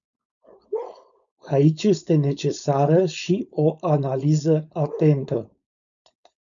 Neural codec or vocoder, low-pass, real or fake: codec, 16 kHz, 4.8 kbps, FACodec; 7.2 kHz; fake